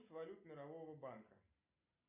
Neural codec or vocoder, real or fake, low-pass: none; real; 3.6 kHz